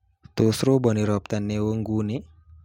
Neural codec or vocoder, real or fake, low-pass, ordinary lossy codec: none; real; 19.8 kHz; MP3, 64 kbps